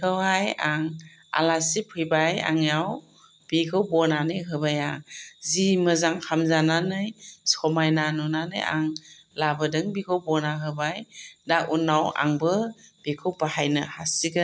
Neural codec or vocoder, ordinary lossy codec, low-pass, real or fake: none; none; none; real